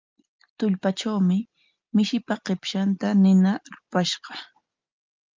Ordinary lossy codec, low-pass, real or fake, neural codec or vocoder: Opus, 32 kbps; 7.2 kHz; real; none